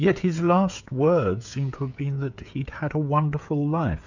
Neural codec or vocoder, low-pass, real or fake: codec, 16 kHz, 16 kbps, FreqCodec, smaller model; 7.2 kHz; fake